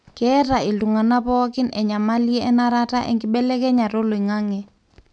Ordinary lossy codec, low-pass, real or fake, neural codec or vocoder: none; 9.9 kHz; real; none